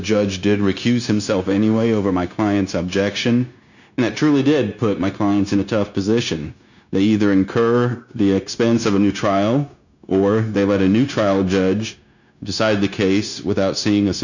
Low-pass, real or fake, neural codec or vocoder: 7.2 kHz; fake; codec, 16 kHz, 0.9 kbps, LongCat-Audio-Codec